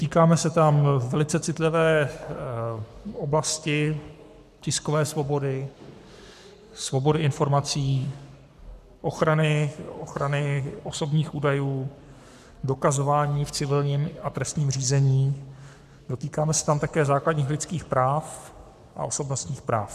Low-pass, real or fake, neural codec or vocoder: 14.4 kHz; fake; codec, 44.1 kHz, 7.8 kbps, Pupu-Codec